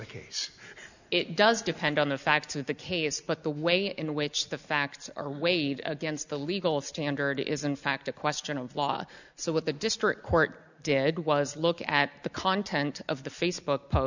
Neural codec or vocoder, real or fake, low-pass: none; real; 7.2 kHz